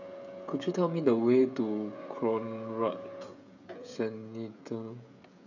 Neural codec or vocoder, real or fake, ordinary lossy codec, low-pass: codec, 16 kHz, 16 kbps, FreqCodec, smaller model; fake; none; 7.2 kHz